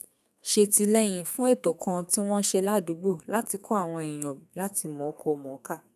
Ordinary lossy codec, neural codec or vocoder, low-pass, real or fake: none; codec, 32 kHz, 1.9 kbps, SNAC; 14.4 kHz; fake